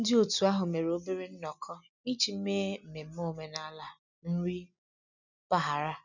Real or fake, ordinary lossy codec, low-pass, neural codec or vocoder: real; none; 7.2 kHz; none